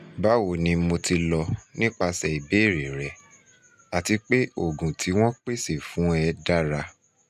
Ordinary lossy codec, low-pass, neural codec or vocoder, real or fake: none; 14.4 kHz; none; real